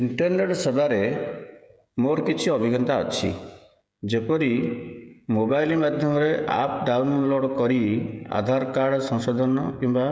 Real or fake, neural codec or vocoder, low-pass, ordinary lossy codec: fake; codec, 16 kHz, 16 kbps, FreqCodec, smaller model; none; none